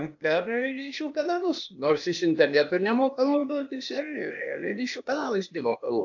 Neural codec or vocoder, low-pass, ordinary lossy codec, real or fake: codec, 16 kHz, 0.8 kbps, ZipCodec; 7.2 kHz; AAC, 48 kbps; fake